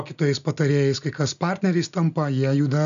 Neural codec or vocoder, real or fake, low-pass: none; real; 7.2 kHz